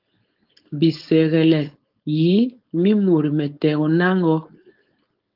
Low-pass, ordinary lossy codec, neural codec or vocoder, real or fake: 5.4 kHz; Opus, 32 kbps; codec, 16 kHz, 4.8 kbps, FACodec; fake